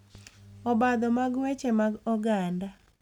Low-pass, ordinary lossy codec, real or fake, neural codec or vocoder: 19.8 kHz; none; real; none